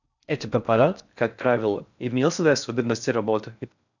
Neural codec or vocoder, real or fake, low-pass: codec, 16 kHz in and 24 kHz out, 0.6 kbps, FocalCodec, streaming, 4096 codes; fake; 7.2 kHz